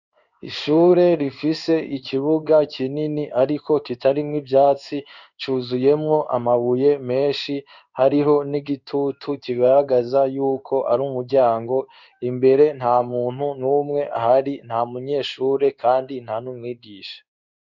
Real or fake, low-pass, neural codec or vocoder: fake; 7.2 kHz; codec, 16 kHz in and 24 kHz out, 1 kbps, XY-Tokenizer